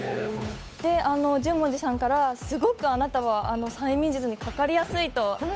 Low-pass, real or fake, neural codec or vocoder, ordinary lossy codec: none; fake; codec, 16 kHz, 8 kbps, FunCodec, trained on Chinese and English, 25 frames a second; none